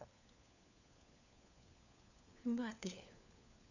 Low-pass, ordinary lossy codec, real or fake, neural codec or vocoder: 7.2 kHz; none; fake; codec, 16 kHz, 4 kbps, FunCodec, trained on LibriTTS, 50 frames a second